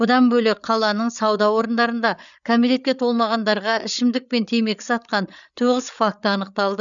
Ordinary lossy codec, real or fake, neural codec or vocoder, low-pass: none; fake; codec, 16 kHz, 8 kbps, FreqCodec, larger model; 7.2 kHz